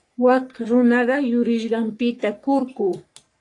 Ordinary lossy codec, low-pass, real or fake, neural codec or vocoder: AAC, 48 kbps; 10.8 kHz; fake; codec, 44.1 kHz, 3.4 kbps, Pupu-Codec